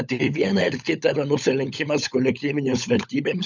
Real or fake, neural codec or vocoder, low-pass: fake; codec, 16 kHz, 16 kbps, FunCodec, trained on LibriTTS, 50 frames a second; 7.2 kHz